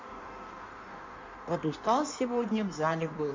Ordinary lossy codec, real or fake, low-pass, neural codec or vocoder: MP3, 48 kbps; fake; 7.2 kHz; codec, 16 kHz in and 24 kHz out, 1.1 kbps, FireRedTTS-2 codec